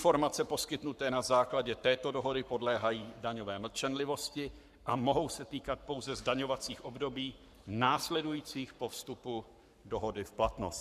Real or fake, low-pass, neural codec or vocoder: fake; 14.4 kHz; codec, 44.1 kHz, 7.8 kbps, Pupu-Codec